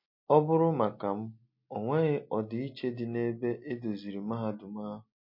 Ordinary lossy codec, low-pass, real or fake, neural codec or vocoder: MP3, 32 kbps; 5.4 kHz; real; none